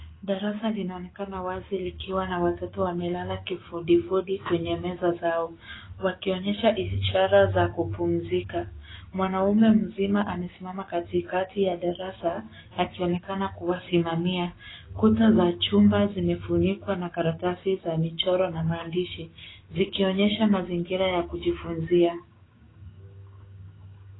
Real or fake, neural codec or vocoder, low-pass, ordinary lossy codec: fake; codec, 16 kHz, 6 kbps, DAC; 7.2 kHz; AAC, 16 kbps